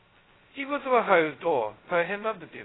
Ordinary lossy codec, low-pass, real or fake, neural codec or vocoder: AAC, 16 kbps; 7.2 kHz; fake; codec, 16 kHz, 0.2 kbps, FocalCodec